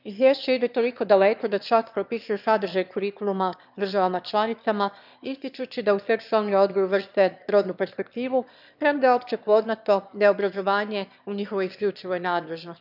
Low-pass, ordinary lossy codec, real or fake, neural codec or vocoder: 5.4 kHz; none; fake; autoencoder, 22.05 kHz, a latent of 192 numbers a frame, VITS, trained on one speaker